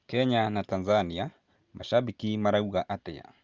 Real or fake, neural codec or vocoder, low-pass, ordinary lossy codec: real; none; 7.2 kHz; Opus, 32 kbps